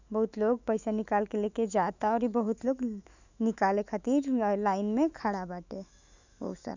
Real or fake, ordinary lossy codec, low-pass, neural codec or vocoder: fake; none; 7.2 kHz; autoencoder, 48 kHz, 128 numbers a frame, DAC-VAE, trained on Japanese speech